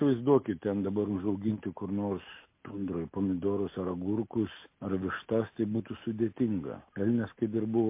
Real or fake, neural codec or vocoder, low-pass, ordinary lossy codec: real; none; 3.6 kHz; MP3, 24 kbps